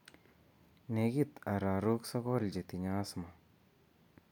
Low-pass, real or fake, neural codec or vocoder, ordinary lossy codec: 19.8 kHz; real; none; none